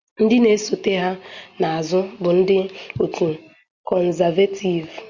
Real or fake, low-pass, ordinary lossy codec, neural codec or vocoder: real; 7.2 kHz; Opus, 64 kbps; none